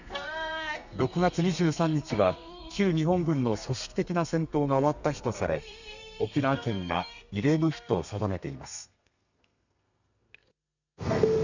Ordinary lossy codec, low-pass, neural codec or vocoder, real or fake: none; 7.2 kHz; codec, 32 kHz, 1.9 kbps, SNAC; fake